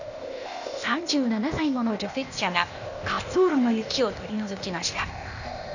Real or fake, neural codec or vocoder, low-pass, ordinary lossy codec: fake; codec, 16 kHz, 0.8 kbps, ZipCodec; 7.2 kHz; none